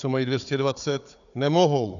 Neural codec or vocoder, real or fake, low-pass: codec, 16 kHz, 4 kbps, FunCodec, trained on Chinese and English, 50 frames a second; fake; 7.2 kHz